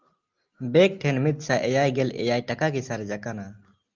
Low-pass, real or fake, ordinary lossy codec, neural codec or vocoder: 7.2 kHz; real; Opus, 24 kbps; none